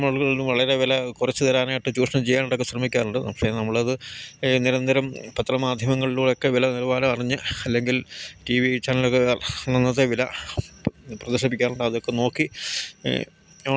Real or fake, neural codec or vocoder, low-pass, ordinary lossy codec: real; none; none; none